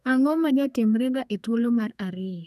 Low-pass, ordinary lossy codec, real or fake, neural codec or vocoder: 14.4 kHz; none; fake; codec, 32 kHz, 1.9 kbps, SNAC